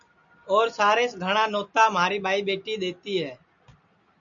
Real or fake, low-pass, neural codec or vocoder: real; 7.2 kHz; none